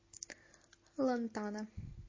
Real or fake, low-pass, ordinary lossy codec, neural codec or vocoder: real; 7.2 kHz; MP3, 32 kbps; none